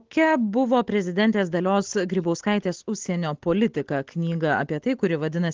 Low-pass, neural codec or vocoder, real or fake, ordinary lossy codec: 7.2 kHz; none; real; Opus, 16 kbps